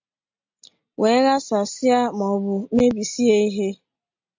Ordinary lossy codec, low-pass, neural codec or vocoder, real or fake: MP3, 48 kbps; 7.2 kHz; none; real